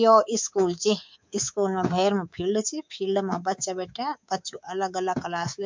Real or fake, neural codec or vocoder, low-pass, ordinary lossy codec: fake; codec, 24 kHz, 3.1 kbps, DualCodec; 7.2 kHz; MP3, 64 kbps